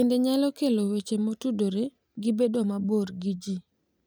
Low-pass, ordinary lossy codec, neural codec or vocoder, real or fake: none; none; none; real